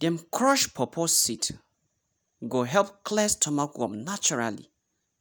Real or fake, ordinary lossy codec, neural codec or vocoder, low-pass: real; none; none; none